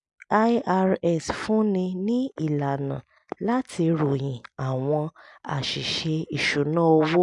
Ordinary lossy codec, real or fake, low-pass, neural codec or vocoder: MP3, 96 kbps; real; 10.8 kHz; none